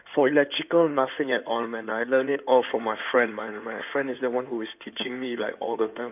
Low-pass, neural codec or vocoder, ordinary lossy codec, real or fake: 3.6 kHz; codec, 16 kHz in and 24 kHz out, 2.2 kbps, FireRedTTS-2 codec; none; fake